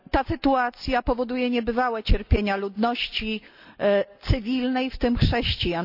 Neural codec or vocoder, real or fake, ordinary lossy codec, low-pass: none; real; none; 5.4 kHz